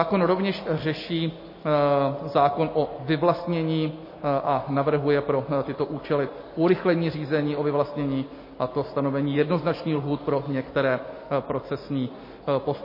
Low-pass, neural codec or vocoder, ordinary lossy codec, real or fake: 5.4 kHz; none; MP3, 24 kbps; real